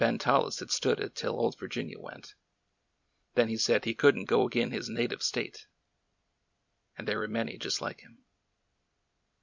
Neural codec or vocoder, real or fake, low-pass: none; real; 7.2 kHz